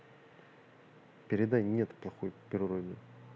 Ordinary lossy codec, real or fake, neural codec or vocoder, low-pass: none; real; none; none